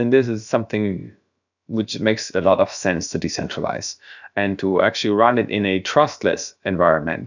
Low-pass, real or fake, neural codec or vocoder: 7.2 kHz; fake; codec, 16 kHz, about 1 kbps, DyCAST, with the encoder's durations